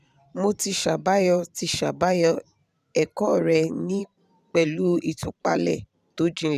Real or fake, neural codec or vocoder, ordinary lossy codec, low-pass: fake; vocoder, 44.1 kHz, 128 mel bands every 512 samples, BigVGAN v2; none; 14.4 kHz